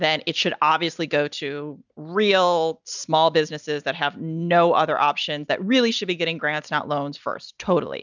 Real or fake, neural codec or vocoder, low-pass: real; none; 7.2 kHz